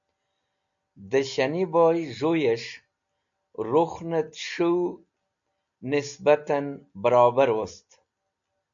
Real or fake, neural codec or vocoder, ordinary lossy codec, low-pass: real; none; MP3, 64 kbps; 7.2 kHz